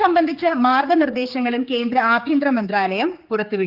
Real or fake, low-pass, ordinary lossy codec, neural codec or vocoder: fake; 5.4 kHz; Opus, 24 kbps; codec, 16 kHz, 4 kbps, X-Codec, HuBERT features, trained on general audio